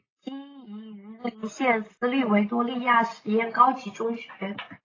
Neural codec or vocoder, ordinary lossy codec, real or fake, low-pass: none; AAC, 32 kbps; real; 7.2 kHz